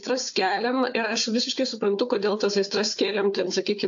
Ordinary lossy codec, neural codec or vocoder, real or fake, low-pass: AAC, 64 kbps; codec, 16 kHz, 4 kbps, FunCodec, trained on Chinese and English, 50 frames a second; fake; 7.2 kHz